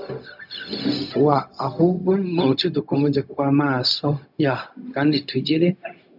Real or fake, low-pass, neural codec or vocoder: fake; 5.4 kHz; codec, 16 kHz, 0.4 kbps, LongCat-Audio-Codec